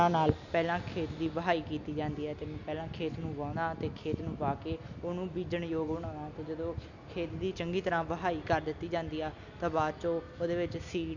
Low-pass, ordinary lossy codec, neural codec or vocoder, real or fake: 7.2 kHz; none; none; real